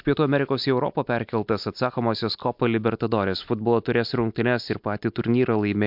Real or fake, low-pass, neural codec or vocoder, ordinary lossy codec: real; 5.4 kHz; none; MP3, 48 kbps